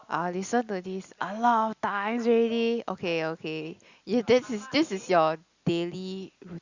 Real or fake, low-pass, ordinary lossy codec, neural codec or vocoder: real; 7.2 kHz; Opus, 64 kbps; none